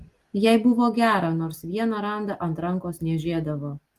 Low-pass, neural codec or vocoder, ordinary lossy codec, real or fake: 14.4 kHz; none; Opus, 24 kbps; real